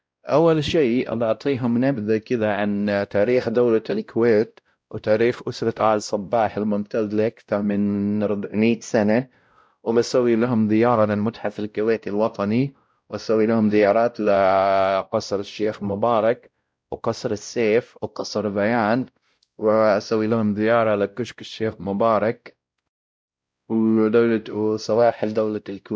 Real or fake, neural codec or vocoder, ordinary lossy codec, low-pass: fake; codec, 16 kHz, 0.5 kbps, X-Codec, WavLM features, trained on Multilingual LibriSpeech; none; none